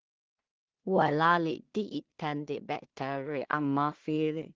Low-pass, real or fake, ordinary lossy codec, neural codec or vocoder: 7.2 kHz; fake; Opus, 16 kbps; codec, 16 kHz in and 24 kHz out, 0.4 kbps, LongCat-Audio-Codec, two codebook decoder